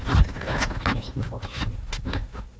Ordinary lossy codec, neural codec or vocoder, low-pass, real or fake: none; codec, 16 kHz, 1 kbps, FunCodec, trained on Chinese and English, 50 frames a second; none; fake